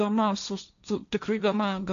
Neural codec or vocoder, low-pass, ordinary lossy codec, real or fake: codec, 16 kHz, 1.1 kbps, Voila-Tokenizer; 7.2 kHz; MP3, 96 kbps; fake